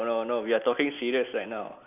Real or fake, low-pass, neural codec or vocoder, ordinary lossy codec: real; 3.6 kHz; none; none